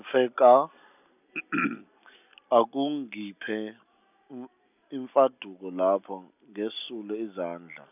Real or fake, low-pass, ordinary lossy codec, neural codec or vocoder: real; 3.6 kHz; none; none